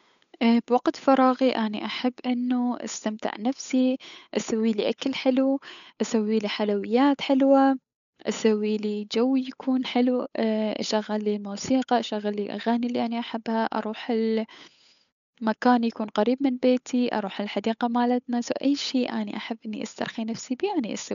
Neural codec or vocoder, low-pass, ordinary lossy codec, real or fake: codec, 16 kHz, 8 kbps, FunCodec, trained on Chinese and English, 25 frames a second; 7.2 kHz; none; fake